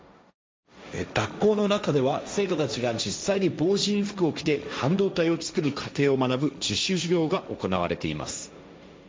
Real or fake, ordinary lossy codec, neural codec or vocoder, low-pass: fake; none; codec, 16 kHz, 1.1 kbps, Voila-Tokenizer; none